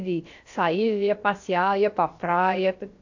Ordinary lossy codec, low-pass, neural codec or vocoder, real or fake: AAC, 48 kbps; 7.2 kHz; codec, 16 kHz, about 1 kbps, DyCAST, with the encoder's durations; fake